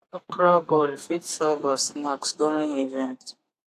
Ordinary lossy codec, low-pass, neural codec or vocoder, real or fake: AAC, 64 kbps; 14.4 kHz; codec, 32 kHz, 1.9 kbps, SNAC; fake